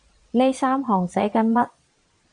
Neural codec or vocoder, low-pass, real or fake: vocoder, 22.05 kHz, 80 mel bands, Vocos; 9.9 kHz; fake